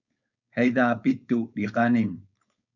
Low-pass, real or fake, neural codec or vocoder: 7.2 kHz; fake; codec, 16 kHz, 4.8 kbps, FACodec